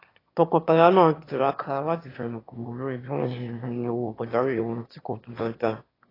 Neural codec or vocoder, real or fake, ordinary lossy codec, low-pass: autoencoder, 22.05 kHz, a latent of 192 numbers a frame, VITS, trained on one speaker; fake; AAC, 24 kbps; 5.4 kHz